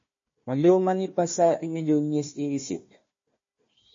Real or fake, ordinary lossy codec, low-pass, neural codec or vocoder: fake; MP3, 32 kbps; 7.2 kHz; codec, 16 kHz, 1 kbps, FunCodec, trained on Chinese and English, 50 frames a second